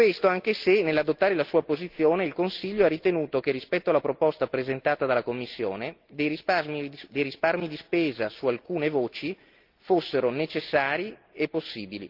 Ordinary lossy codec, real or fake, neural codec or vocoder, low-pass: Opus, 32 kbps; real; none; 5.4 kHz